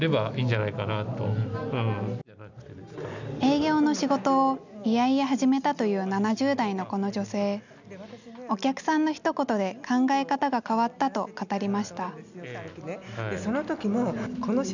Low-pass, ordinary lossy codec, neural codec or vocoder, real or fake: 7.2 kHz; none; none; real